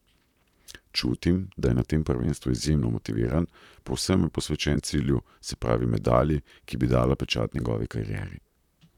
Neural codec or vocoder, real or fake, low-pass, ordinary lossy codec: vocoder, 44.1 kHz, 128 mel bands, Pupu-Vocoder; fake; 19.8 kHz; none